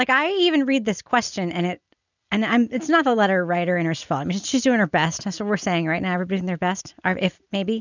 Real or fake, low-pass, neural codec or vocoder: real; 7.2 kHz; none